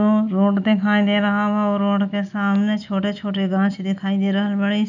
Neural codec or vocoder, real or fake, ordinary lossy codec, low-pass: none; real; none; 7.2 kHz